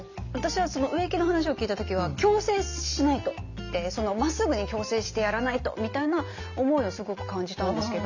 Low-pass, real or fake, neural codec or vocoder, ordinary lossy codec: 7.2 kHz; real; none; none